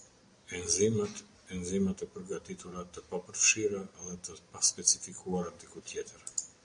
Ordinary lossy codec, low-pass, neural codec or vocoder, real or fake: AAC, 64 kbps; 9.9 kHz; none; real